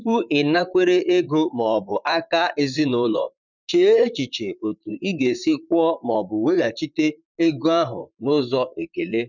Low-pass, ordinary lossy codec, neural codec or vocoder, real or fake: 7.2 kHz; none; vocoder, 44.1 kHz, 128 mel bands, Pupu-Vocoder; fake